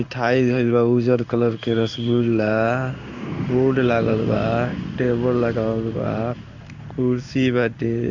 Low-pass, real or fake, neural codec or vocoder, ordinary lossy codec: 7.2 kHz; fake; codec, 16 kHz in and 24 kHz out, 1 kbps, XY-Tokenizer; none